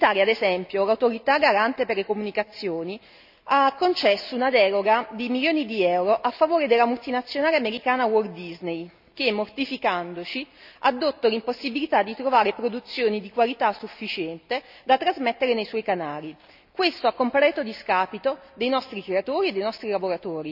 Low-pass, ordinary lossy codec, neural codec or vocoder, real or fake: 5.4 kHz; none; none; real